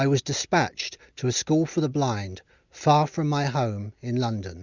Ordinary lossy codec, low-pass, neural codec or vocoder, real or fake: Opus, 64 kbps; 7.2 kHz; none; real